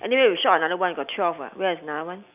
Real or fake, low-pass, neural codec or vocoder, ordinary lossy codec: real; 3.6 kHz; none; none